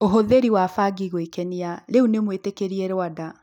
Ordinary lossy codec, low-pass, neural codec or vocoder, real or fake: none; 19.8 kHz; none; real